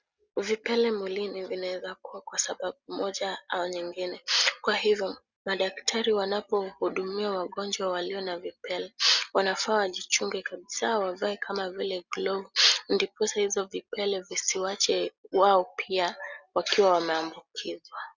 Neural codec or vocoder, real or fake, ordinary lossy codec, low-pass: none; real; Opus, 64 kbps; 7.2 kHz